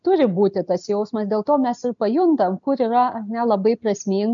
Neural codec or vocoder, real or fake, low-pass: none; real; 7.2 kHz